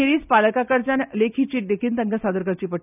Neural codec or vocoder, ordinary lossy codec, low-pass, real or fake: none; none; 3.6 kHz; real